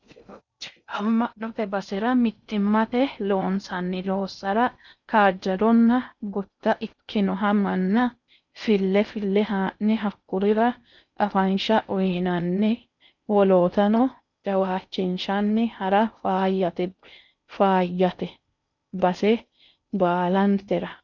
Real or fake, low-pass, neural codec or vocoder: fake; 7.2 kHz; codec, 16 kHz in and 24 kHz out, 0.6 kbps, FocalCodec, streaming, 4096 codes